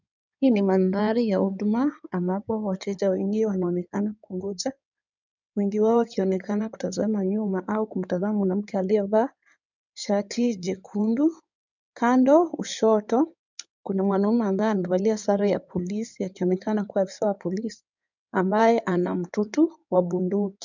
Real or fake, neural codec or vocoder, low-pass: fake; codec, 16 kHz in and 24 kHz out, 2.2 kbps, FireRedTTS-2 codec; 7.2 kHz